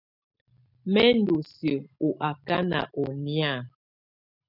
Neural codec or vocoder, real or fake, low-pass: none; real; 5.4 kHz